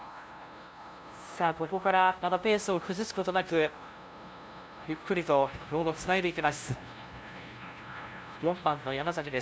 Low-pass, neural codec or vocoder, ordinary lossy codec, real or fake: none; codec, 16 kHz, 0.5 kbps, FunCodec, trained on LibriTTS, 25 frames a second; none; fake